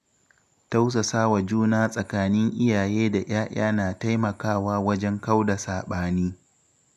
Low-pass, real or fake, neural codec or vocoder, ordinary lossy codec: 14.4 kHz; real; none; none